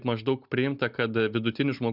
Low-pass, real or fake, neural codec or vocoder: 5.4 kHz; real; none